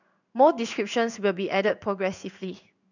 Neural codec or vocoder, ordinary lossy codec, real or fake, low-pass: codec, 16 kHz in and 24 kHz out, 1 kbps, XY-Tokenizer; none; fake; 7.2 kHz